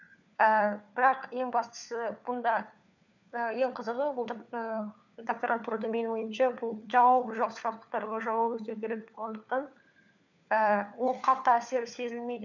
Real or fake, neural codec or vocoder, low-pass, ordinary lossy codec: fake; codec, 16 kHz, 4 kbps, FunCodec, trained on LibriTTS, 50 frames a second; 7.2 kHz; none